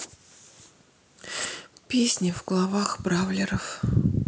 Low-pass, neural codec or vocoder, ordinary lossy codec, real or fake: none; none; none; real